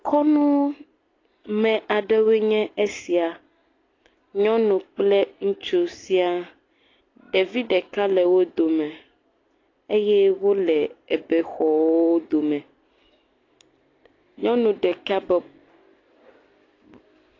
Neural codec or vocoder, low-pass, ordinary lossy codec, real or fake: none; 7.2 kHz; AAC, 32 kbps; real